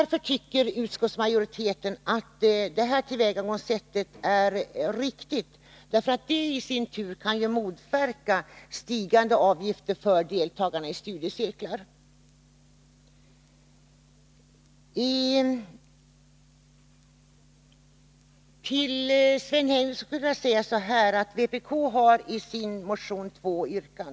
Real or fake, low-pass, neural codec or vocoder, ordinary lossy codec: real; none; none; none